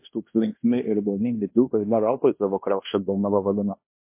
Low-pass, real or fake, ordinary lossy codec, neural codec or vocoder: 3.6 kHz; fake; MP3, 24 kbps; codec, 16 kHz in and 24 kHz out, 0.9 kbps, LongCat-Audio-Codec, fine tuned four codebook decoder